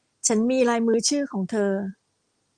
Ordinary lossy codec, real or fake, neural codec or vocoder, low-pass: Opus, 32 kbps; real; none; 9.9 kHz